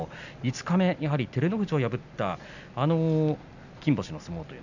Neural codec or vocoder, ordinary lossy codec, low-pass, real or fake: none; none; 7.2 kHz; real